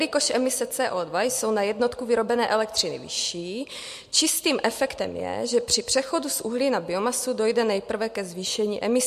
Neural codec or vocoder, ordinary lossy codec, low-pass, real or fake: none; MP3, 64 kbps; 14.4 kHz; real